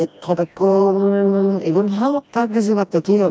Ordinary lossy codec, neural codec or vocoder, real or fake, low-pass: none; codec, 16 kHz, 1 kbps, FreqCodec, smaller model; fake; none